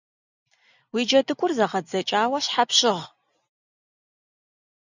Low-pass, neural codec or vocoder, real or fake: 7.2 kHz; none; real